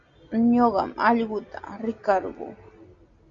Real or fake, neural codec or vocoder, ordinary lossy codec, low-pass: real; none; Opus, 64 kbps; 7.2 kHz